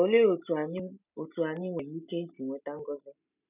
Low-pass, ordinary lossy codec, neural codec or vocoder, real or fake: 3.6 kHz; none; none; real